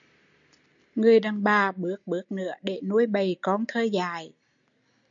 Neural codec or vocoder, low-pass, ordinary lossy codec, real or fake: none; 7.2 kHz; MP3, 64 kbps; real